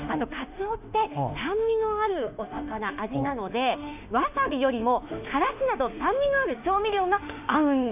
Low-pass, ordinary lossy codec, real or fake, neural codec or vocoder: 3.6 kHz; none; fake; autoencoder, 48 kHz, 32 numbers a frame, DAC-VAE, trained on Japanese speech